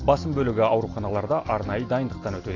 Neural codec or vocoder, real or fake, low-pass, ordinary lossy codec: none; real; 7.2 kHz; AAC, 32 kbps